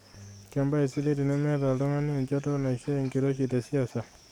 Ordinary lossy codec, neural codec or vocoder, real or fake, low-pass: none; codec, 44.1 kHz, 7.8 kbps, DAC; fake; 19.8 kHz